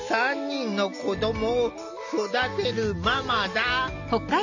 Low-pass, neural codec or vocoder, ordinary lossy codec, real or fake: 7.2 kHz; none; none; real